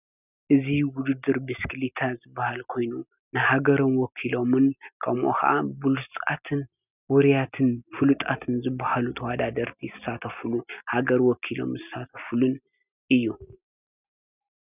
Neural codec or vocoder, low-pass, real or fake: none; 3.6 kHz; real